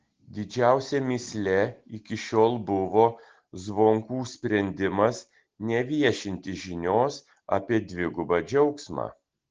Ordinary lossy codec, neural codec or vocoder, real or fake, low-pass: Opus, 16 kbps; none; real; 7.2 kHz